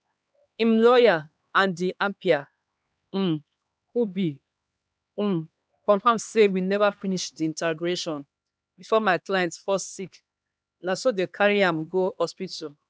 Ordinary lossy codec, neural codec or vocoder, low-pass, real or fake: none; codec, 16 kHz, 2 kbps, X-Codec, HuBERT features, trained on LibriSpeech; none; fake